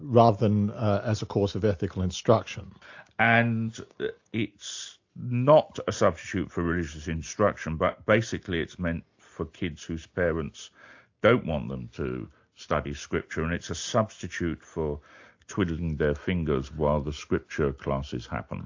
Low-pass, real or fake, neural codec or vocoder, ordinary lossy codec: 7.2 kHz; real; none; AAC, 48 kbps